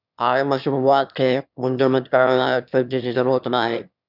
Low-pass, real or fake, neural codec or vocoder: 5.4 kHz; fake; autoencoder, 22.05 kHz, a latent of 192 numbers a frame, VITS, trained on one speaker